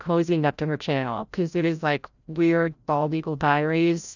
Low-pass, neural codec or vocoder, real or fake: 7.2 kHz; codec, 16 kHz, 0.5 kbps, FreqCodec, larger model; fake